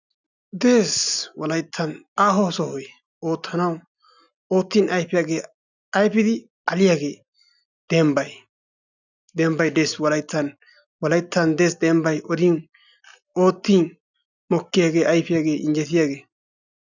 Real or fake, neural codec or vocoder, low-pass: real; none; 7.2 kHz